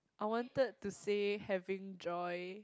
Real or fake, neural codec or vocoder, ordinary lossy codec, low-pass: real; none; none; none